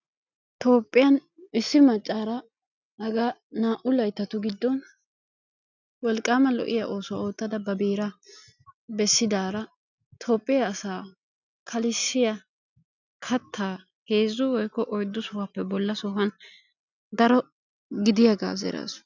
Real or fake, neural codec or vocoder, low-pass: real; none; 7.2 kHz